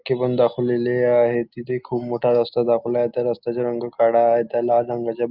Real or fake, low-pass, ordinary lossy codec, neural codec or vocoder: real; 5.4 kHz; Opus, 24 kbps; none